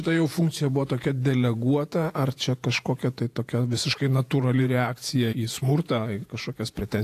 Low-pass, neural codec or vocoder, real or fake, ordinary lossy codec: 14.4 kHz; vocoder, 48 kHz, 128 mel bands, Vocos; fake; AAC, 64 kbps